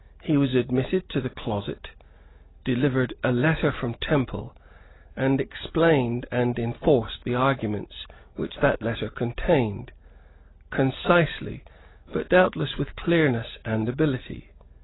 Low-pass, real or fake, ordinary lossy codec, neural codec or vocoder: 7.2 kHz; real; AAC, 16 kbps; none